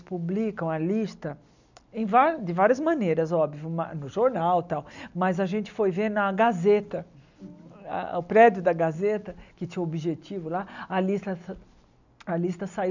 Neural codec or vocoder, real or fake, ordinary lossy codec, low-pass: none; real; none; 7.2 kHz